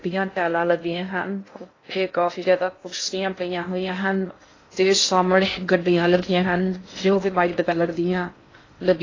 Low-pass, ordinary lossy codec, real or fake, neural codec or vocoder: 7.2 kHz; AAC, 32 kbps; fake; codec, 16 kHz in and 24 kHz out, 0.6 kbps, FocalCodec, streaming, 2048 codes